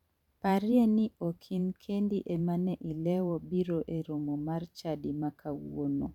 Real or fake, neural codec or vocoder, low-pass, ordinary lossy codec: fake; vocoder, 44.1 kHz, 128 mel bands every 512 samples, BigVGAN v2; 19.8 kHz; none